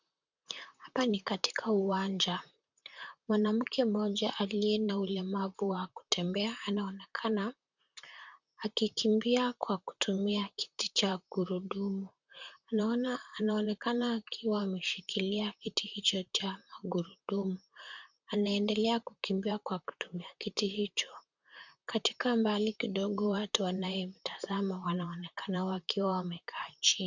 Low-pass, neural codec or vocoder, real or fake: 7.2 kHz; vocoder, 44.1 kHz, 128 mel bands, Pupu-Vocoder; fake